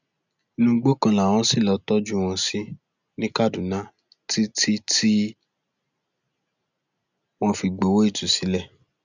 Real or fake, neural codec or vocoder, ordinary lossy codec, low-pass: real; none; none; 7.2 kHz